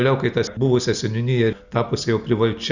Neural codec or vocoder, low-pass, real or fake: none; 7.2 kHz; real